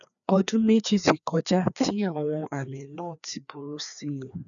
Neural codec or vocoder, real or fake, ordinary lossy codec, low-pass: codec, 16 kHz, 2 kbps, FreqCodec, larger model; fake; none; 7.2 kHz